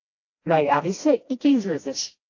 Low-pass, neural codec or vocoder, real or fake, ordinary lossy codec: 7.2 kHz; codec, 16 kHz, 1 kbps, FreqCodec, smaller model; fake; AAC, 48 kbps